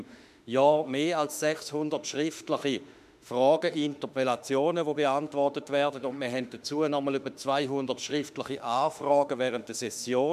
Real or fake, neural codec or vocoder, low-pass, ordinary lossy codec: fake; autoencoder, 48 kHz, 32 numbers a frame, DAC-VAE, trained on Japanese speech; 14.4 kHz; none